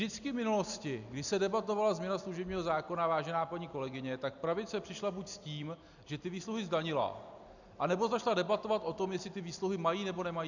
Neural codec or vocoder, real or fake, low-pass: none; real; 7.2 kHz